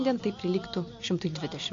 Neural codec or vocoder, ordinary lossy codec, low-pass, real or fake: none; AAC, 48 kbps; 7.2 kHz; real